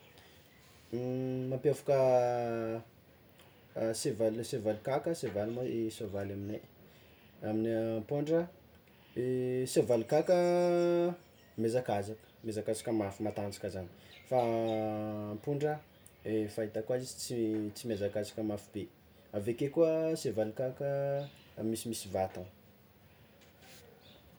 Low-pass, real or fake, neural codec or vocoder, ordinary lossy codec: none; real; none; none